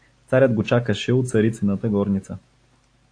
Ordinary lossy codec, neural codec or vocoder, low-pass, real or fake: AAC, 48 kbps; none; 9.9 kHz; real